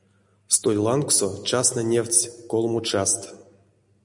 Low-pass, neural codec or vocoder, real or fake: 10.8 kHz; none; real